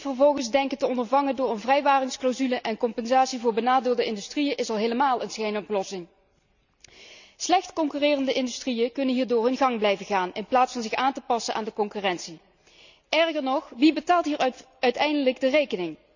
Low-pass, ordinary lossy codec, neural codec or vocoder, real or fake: 7.2 kHz; none; none; real